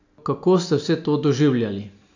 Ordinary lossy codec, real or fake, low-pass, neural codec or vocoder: AAC, 48 kbps; real; 7.2 kHz; none